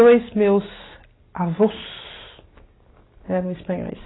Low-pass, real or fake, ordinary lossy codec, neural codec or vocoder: 7.2 kHz; real; AAC, 16 kbps; none